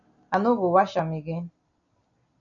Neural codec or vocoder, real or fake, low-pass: none; real; 7.2 kHz